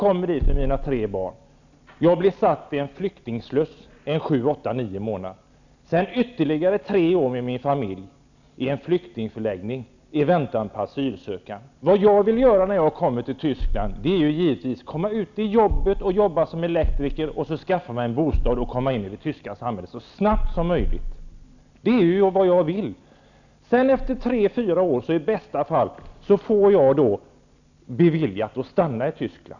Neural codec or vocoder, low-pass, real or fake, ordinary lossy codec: none; 7.2 kHz; real; none